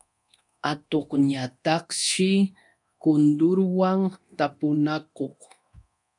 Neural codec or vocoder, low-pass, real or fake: codec, 24 kHz, 0.9 kbps, DualCodec; 10.8 kHz; fake